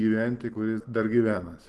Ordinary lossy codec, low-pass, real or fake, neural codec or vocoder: Opus, 16 kbps; 10.8 kHz; real; none